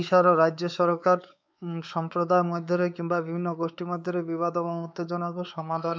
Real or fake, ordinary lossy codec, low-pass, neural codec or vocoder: fake; none; none; codec, 16 kHz, 4 kbps, X-Codec, WavLM features, trained on Multilingual LibriSpeech